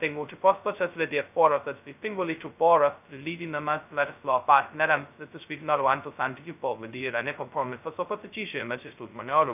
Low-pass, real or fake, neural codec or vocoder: 3.6 kHz; fake; codec, 16 kHz, 0.2 kbps, FocalCodec